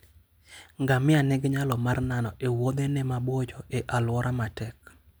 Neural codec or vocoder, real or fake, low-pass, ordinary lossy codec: none; real; none; none